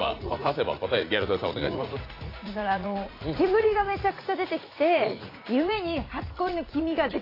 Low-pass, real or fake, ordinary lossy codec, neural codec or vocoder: 5.4 kHz; fake; AAC, 24 kbps; vocoder, 44.1 kHz, 80 mel bands, Vocos